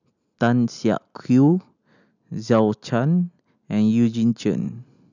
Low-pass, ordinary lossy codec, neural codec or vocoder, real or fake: 7.2 kHz; none; none; real